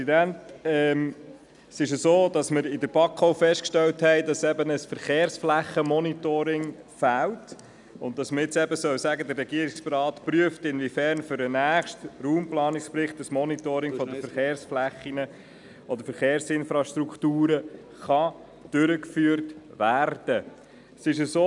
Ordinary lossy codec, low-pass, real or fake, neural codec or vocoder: none; 10.8 kHz; real; none